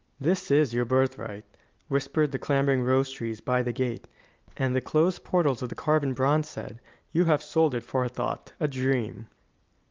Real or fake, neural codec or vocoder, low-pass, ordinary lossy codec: real; none; 7.2 kHz; Opus, 24 kbps